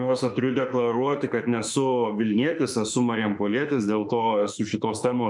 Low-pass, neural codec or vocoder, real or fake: 10.8 kHz; autoencoder, 48 kHz, 32 numbers a frame, DAC-VAE, trained on Japanese speech; fake